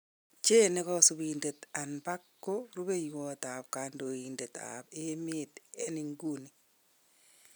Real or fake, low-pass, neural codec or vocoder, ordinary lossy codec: real; none; none; none